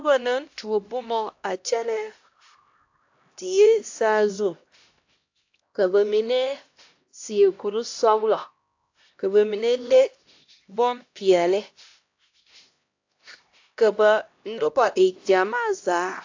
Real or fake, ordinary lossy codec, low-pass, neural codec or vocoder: fake; AAC, 48 kbps; 7.2 kHz; codec, 16 kHz, 1 kbps, X-Codec, HuBERT features, trained on LibriSpeech